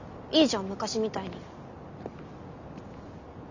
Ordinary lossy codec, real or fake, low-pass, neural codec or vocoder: none; real; 7.2 kHz; none